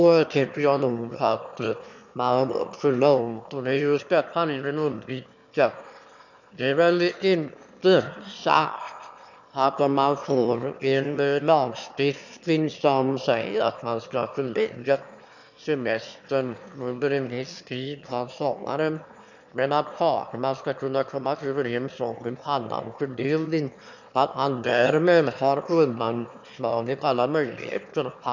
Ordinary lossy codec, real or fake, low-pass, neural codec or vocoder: none; fake; 7.2 kHz; autoencoder, 22.05 kHz, a latent of 192 numbers a frame, VITS, trained on one speaker